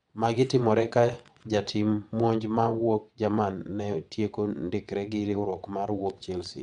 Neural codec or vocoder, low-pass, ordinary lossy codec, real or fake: vocoder, 22.05 kHz, 80 mel bands, WaveNeXt; 9.9 kHz; none; fake